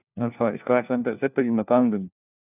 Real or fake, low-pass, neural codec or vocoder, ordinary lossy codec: fake; 3.6 kHz; codec, 16 kHz, 1 kbps, FunCodec, trained on LibriTTS, 50 frames a second; none